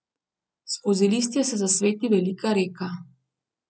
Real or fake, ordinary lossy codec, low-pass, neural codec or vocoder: real; none; none; none